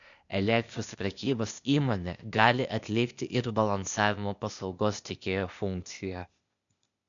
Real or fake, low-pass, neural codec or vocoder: fake; 7.2 kHz; codec, 16 kHz, 0.8 kbps, ZipCodec